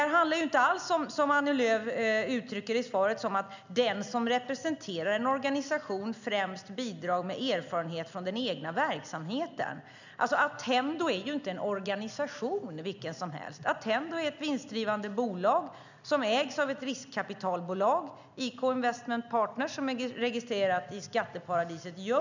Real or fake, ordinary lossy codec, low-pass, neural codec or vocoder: real; none; 7.2 kHz; none